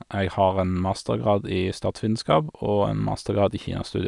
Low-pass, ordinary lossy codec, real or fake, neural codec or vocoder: 10.8 kHz; none; real; none